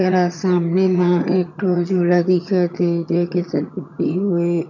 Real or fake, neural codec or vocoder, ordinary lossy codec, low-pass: fake; vocoder, 22.05 kHz, 80 mel bands, HiFi-GAN; none; 7.2 kHz